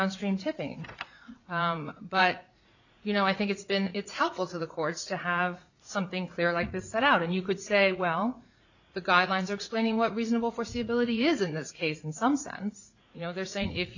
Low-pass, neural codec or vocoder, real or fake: 7.2 kHz; vocoder, 44.1 kHz, 80 mel bands, Vocos; fake